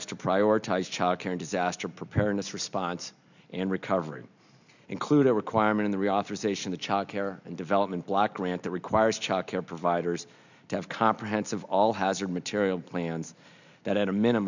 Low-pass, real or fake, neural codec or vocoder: 7.2 kHz; real; none